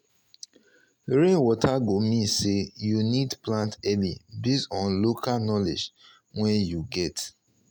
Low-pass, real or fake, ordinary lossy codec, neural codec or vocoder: 19.8 kHz; real; none; none